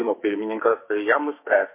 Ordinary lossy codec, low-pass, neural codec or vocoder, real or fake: MP3, 24 kbps; 3.6 kHz; codec, 44.1 kHz, 2.6 kbps, SNAC; fake